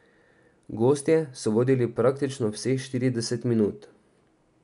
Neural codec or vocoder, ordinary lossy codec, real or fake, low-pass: none; none; real; 10.8 kHz